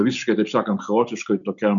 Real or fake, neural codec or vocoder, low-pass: real; none; 7.2 kHz